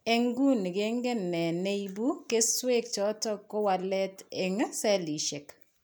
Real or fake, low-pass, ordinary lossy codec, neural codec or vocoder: real; none; none; none